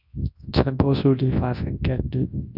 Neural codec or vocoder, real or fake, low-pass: codec, 24 kHz, 0.9 kbps, WavTokenizer, large speech release; fake; 5.4 kHz